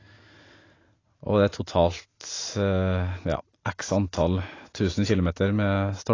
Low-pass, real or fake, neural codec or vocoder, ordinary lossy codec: 7.2 kHz; real; none; AAC, 32 kbps